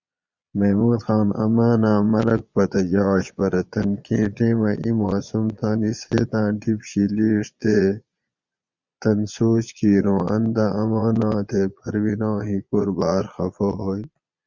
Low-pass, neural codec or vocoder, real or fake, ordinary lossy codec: 7.2 kHz; vocoder, 22.05 kHz, 80 mel bands, Vocos; fake; Opus, 64 kbps